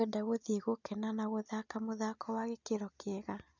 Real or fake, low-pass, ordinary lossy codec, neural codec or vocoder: real; 7.2 kHz; none; none